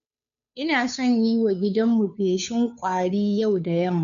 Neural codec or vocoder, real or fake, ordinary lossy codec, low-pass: codec, 16 kHz, 2 kbps, FunCodec, trained on Chinese and English, 25 frames a second; fake; none; 7.2 kHz